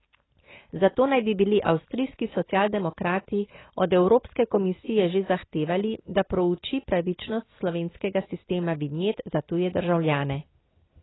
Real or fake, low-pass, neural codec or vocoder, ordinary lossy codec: real; 7.2 kHz; none; AAC, 16 kbps